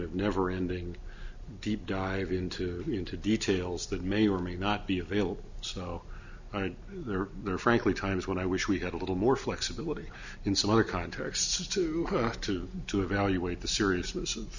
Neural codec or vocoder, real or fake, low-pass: none; real; 7.2 kHz